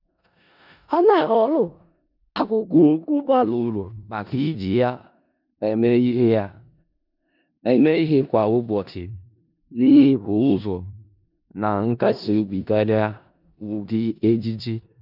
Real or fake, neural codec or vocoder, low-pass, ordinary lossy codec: fake; codec, 16 kHz in and 24 kHz out, 0.4 kbps, LongCat-Audio-Codec, four codebook decoder; 5.4 kHz; none